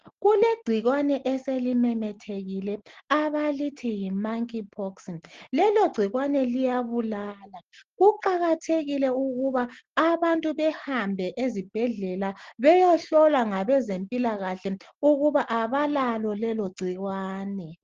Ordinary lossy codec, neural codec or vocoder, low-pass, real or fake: Opus, 16 kbps; none; 7.2 kHz; real